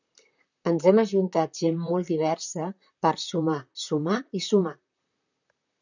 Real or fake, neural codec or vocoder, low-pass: fake; vocoder, 44.1 kHz, 128 mel bands, Pupu-Vocoder; 7.2 kHz